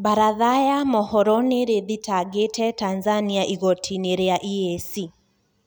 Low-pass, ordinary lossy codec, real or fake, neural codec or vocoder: none; none; real; none